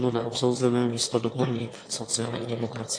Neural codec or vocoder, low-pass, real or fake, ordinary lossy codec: autoencoder, 22.05 kHz, a latent of 192 numbers a frame, VITS, trained on one speaker; 9.9 kHz; fake; AAC, 48 kbps